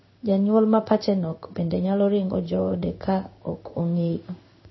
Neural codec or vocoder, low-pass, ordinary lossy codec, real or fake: codec, 16 kHz in and 24 kHz out, 1 kbps, XY-Tokenizer; 7.2 kHz; MP3, 24 kbps; fake